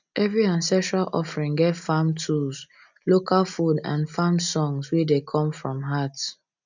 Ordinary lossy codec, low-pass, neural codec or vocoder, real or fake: none; 7.2 kHz; none; real